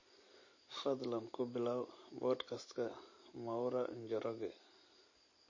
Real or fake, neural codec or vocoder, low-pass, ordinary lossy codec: real; none; 7.2 kHz; MP3, 32 kbps